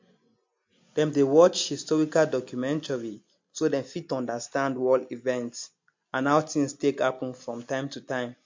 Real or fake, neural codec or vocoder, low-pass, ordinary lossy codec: real; none; 7.2 kHz; MP3, 48 kbps